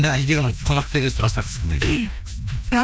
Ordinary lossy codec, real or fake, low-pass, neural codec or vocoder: none; fake; none; codec, 16 kHz, 1 kbps, FreqCodec, larger model